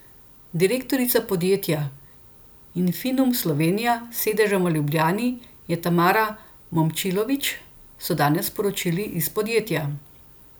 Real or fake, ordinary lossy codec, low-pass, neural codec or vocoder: real; none; none; none